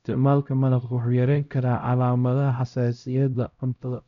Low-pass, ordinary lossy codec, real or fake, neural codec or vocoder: 7.2 kHz; none; fake; codec, 16 kHz, 0.5 kbps, X-Codec, HuBERT features, trained on LibriSpeech